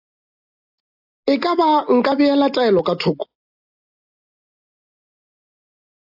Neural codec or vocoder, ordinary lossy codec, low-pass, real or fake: none; AAC, 48 kbps; 5.4 kHz; real